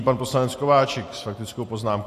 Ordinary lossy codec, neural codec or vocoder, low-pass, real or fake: AAC, 64 kbps; none; 14.4 kHz; real